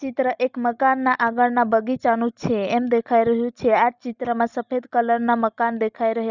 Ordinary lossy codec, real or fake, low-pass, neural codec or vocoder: none; real; 7.2 kHz; none